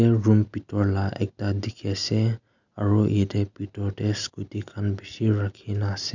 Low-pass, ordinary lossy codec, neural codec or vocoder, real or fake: 7.2 kHz; none; none; real